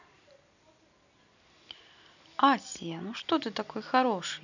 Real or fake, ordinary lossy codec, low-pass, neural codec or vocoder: real; none; 7.2 kHz; none